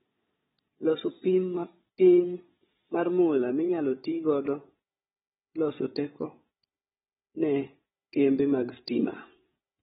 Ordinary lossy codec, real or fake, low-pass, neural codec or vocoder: AAC, 16 kbps; fake; 7.2 kHz; codec, 16 kHz, 16 kbps, FunCodec, trained on Chinese and English, 50 frames a second